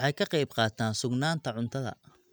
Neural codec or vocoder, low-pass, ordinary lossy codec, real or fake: none; none; none; real